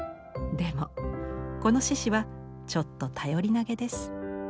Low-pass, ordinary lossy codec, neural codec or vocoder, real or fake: none; none; none; real